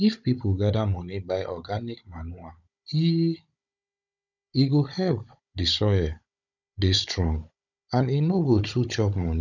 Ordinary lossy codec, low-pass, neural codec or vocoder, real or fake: none; 7.2 kHz; codec, 16 kHz, 16 kbps, FunCodec, trained on Chinese and English, 50 frames a second; fake